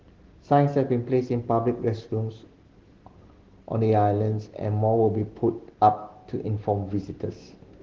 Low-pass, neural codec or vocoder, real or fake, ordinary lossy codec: 7.2 kHz; none; real; Opus, 16 kbps